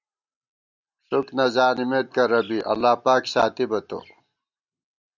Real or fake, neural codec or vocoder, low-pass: real; none; 7.2 kHz